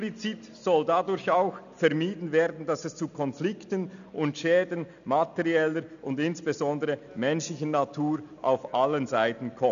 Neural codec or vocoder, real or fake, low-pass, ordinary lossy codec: none; real; 7.2 kHz; none